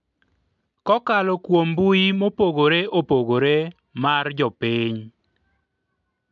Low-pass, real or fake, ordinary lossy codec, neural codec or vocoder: 7.2 kHz; real; MP3, 64 kbps; none